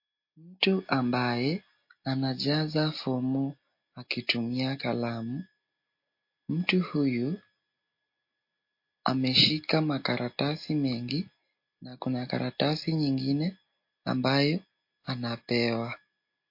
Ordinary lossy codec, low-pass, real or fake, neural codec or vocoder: MP3, 32 kbps; 5.4 kHz; real; none